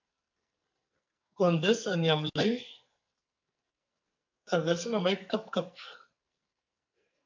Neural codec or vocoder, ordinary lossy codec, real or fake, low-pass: codec, 44.1 kHz, 2.6 kbps, SNAC; MP3, 64 kbps; fake; 7.2 kHz